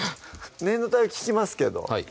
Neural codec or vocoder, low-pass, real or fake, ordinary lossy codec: none; none; real; none